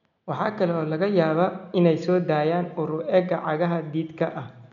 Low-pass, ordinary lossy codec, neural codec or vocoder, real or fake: 7.2 kHz; none; none; real